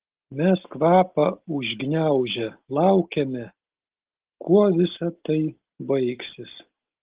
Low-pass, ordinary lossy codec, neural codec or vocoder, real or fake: 3.6 kHz; Opus, 16 kbps; none; real